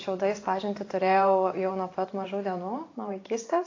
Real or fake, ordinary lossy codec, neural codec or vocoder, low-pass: fake; AAC, 32 kbps; vocoder, 44.1 kHz, 128 mel bands every 512 samples, BigVGAN v2; 7.2 kHz